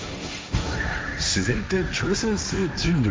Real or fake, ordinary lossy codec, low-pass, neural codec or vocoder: fake; none; none; codec, 16 kHz, 1.1 kbps, Voila-Tokenizer